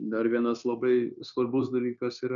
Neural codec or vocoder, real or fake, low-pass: codec, 16 kHz, 0.9 kbps, LongCat-Audio-Codec; fake; 7.2 kHz